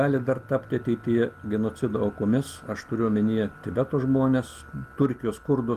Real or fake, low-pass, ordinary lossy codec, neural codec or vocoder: real; 14.4 kHz; Opus, 24 kbps; none